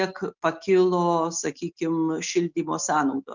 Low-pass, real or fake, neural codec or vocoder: 7.2 kHz; real; none